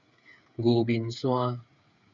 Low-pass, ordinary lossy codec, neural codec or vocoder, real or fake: 7.2 kHz; MP3, 48 kbps; codec, 16 kHz, 8 kbps, FreqCodec, smaller model; fake